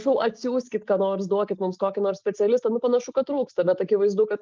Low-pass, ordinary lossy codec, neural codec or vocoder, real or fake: 7.2 kHz; Opus, 24 kbps; none; real